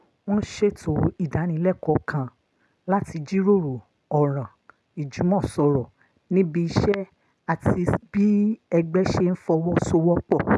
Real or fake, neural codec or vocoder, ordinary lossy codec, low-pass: real; none; none; none